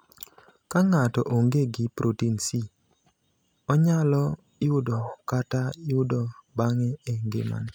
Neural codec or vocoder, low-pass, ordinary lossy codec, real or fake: none; none; none; real